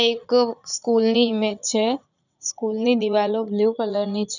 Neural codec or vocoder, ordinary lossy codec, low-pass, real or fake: vocoder, 44.1 kHz, 80 mel bands, Vocos; none; 7.2 kHz; fake